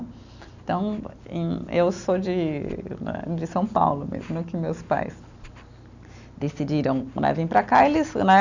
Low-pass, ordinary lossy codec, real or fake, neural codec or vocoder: 7.2 kHz; none; real; none